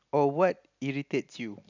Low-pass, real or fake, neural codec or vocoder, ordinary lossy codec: 7.2 kHz; real; none; none